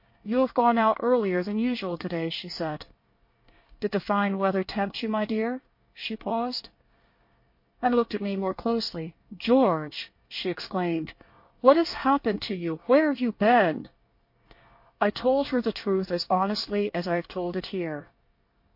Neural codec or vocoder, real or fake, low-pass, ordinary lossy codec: codec, 24 kHz, 1 kbps, SNAC; fake; 5.4 kHz; MP3, 32 kbps